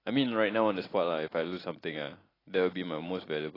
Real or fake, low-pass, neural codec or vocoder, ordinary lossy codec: real; 5.4 kHz; none; AAC, 24 kbps